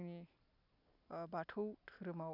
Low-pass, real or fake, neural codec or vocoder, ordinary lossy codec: 5.4 kHz; real; none; none